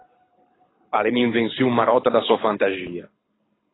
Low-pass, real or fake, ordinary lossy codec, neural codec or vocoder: 7.2 kHz; fake; AAC, 16 kbps; codec, 24 kHz, 6 kbps, HILCodec